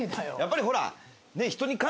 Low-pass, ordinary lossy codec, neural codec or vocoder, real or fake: none; none; none; real